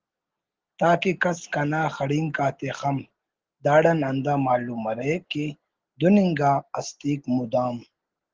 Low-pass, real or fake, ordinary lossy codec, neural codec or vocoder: 7.2 kHz; real; Opus, 16 kbps; none